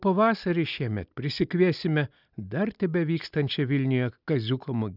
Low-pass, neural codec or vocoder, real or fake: 5.4 kHz; none; real